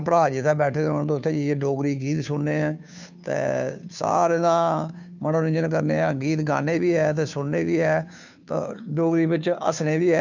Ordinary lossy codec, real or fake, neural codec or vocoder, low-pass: none; fake; codec, 16 kHz, 2 kbps, FunCodec, trained on Chinese and English, 25 frames a second; 7.2 kHz